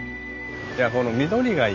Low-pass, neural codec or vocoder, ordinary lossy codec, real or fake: 7.2 kHz; none; AAC, 48 kbps; real